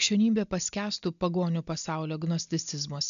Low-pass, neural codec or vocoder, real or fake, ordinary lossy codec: 7.2 kHz; none; real; MP3, 96 kbps